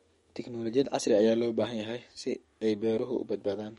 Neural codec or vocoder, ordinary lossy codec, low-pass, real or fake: codec, 44.1 kHz, 7.8 kbps, DAC; MP3, 48 kbps; 19.8 kHz; fake